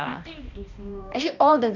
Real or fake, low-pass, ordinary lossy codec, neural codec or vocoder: fake; 7.2 kHz; none; codec, 16 kHz, 1 kbps, X-Codec, HuBERT features, trained on balanced general audio